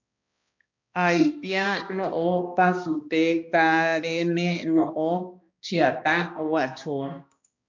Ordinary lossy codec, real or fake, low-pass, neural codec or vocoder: MP3, 64 kbps; fake; 7.2 kHz; codec, 16 kHz, 1 kbps, X-Codec, HuBERT features, trained on balanced general audio